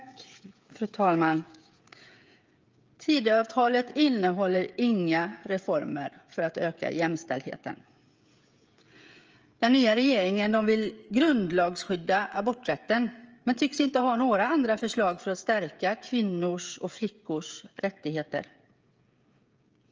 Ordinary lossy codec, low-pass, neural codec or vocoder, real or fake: Opus, 24 kbps; 7.2 kHz; codec, 16 kHz, 16 kbps, FreqCodec, smaller model; fake